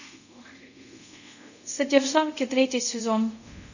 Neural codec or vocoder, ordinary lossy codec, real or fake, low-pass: codec, 24 kHz, 0.5 kbps, DualCodec; none; fake; 7.2 kHz